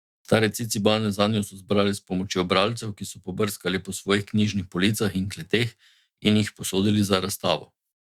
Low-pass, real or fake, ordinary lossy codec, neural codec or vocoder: 19.8 kHz; fake; Opus, 64 kbps; autoencoder, 48 kHz, 128 numbers a frame, DAC-VAE, trained on Japanese speech